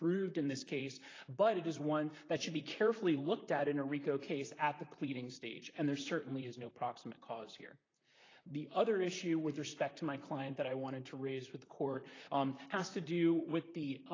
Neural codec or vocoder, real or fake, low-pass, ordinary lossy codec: vocoder, 44.1 kHz, 128 mel bands, Pupu-Vocoder; fake; 7.2 kHz; AAC, 32 kbps